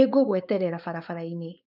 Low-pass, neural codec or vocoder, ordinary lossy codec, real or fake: 5.4 kHz; codec, 16 kHz, 4.8 kbps, FACodec; none; fake